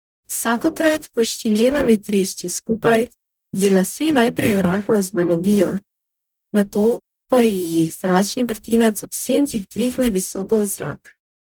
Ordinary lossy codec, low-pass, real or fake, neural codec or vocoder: none; none; fake; codec, 44.1 kHz, 0.9 kbps, DAC